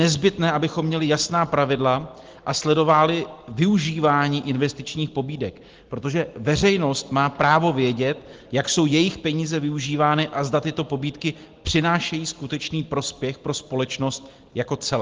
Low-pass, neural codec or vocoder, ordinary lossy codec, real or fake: 7.2 kHz; none; Opus, 16 kbps; real